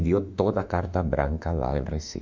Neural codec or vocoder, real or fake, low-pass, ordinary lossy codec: autoencoder, 48 kHz, 32 numbers a frame, DAC-VAE, trained on Japanese speech; fake; 7.2 kHz; MP3, 64 kbps